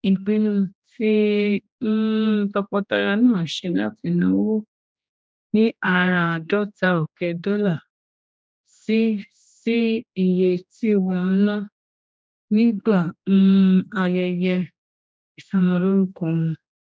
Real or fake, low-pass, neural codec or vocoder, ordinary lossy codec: fake; none; codec, 16 kHz, 1 kbps, X-Codec, HuBERT features, trained on general audio; none